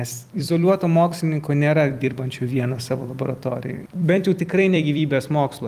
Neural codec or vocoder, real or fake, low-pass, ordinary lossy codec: vocoder, 44.1 kHz, 128 mel bands every 256 samples, BigVGAN v2; fake; 14.4 kHz; Opus, 32 kbps